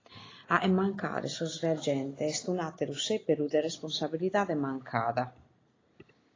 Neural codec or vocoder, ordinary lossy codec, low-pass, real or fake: none; AAC, 32 kbps; 7.2 kHz; real